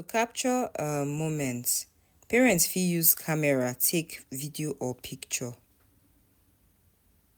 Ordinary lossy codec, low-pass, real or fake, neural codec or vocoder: none; none; real; none